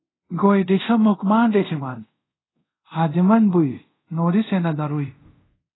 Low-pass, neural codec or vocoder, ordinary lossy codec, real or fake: 7.2 kHz; codec, 24 kHz, 0.5 kbps, DualCodec; AAC, 16 kbps; fake